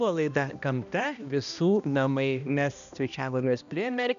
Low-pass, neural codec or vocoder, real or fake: 7.2 kHz; codec, 16 kHz, 1 kbps, X-Codec, HuBERT features, trained on balanced general audio; fake